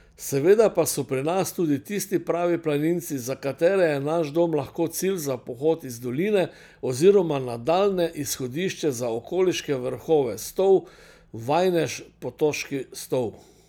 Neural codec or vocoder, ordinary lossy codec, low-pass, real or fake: none; none; none; real